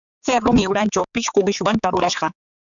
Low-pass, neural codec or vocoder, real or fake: 7.2 kHz; codec, 16 kHz, 4 kbps, X-Codec, HuBERT features, trained on general audio; fake